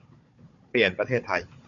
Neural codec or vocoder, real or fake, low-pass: codec, 16 kHz, 16 kbps, FunCodec, trained on Chinese and English, 50 frames a second; fake; 7.2 kHz